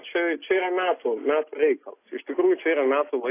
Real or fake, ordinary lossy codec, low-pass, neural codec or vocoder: real; AAC, 24 kbps; 3.6 kHz; none